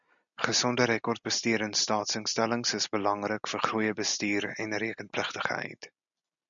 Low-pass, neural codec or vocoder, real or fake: 7.2 kHz; none; real